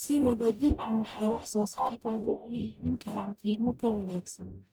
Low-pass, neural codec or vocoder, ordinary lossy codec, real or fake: none; codec, 44.1 kHz, 0.9 kbps, DAC; none; fake